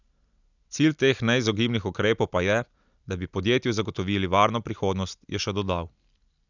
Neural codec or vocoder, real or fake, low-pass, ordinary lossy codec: none; real; 7.2 kHz; none